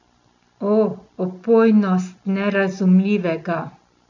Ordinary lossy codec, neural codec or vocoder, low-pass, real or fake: none; none; 7.2 kHz; real